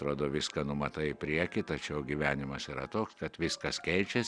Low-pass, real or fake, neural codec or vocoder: 9.9 kHz; real; none